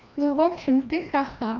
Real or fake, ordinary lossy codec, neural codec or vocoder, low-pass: fake; none; codec, 16 kHz, 1 kbps, FreqCodec, larger model; 7.2 kHz